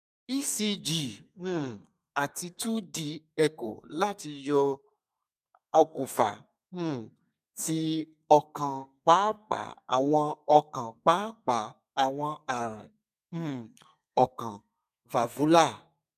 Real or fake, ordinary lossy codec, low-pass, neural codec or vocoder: fake; none; 14.4 kHz; codec, 32 kHz, 1.9 kbps, SNAC